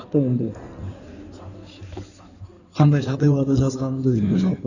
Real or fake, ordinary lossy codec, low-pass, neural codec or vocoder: fake; none; 7.2 kHz; codec, 16 kHz in and 24 kHz out, 2.2 kbps, FireRedTTS-2 codec